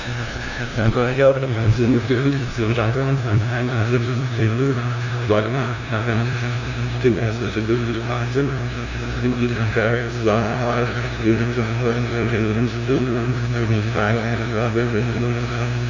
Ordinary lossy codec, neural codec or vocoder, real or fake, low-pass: none; codec, 16 kHz, 0.5 kbps, FunCodec, trained on LibriTTS, 25 frames a second; fake; 7.2 kHz